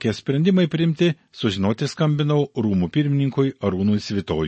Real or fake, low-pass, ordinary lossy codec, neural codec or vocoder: real; 10.8 kHz; MP3, 32 kbps; none